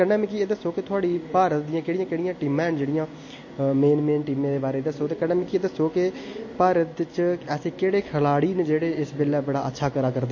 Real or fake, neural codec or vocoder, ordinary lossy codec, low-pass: real; none; MP3, 32 kbps; 7.2 kHz